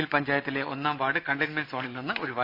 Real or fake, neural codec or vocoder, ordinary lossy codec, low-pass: real; none; none; 5.4 kHz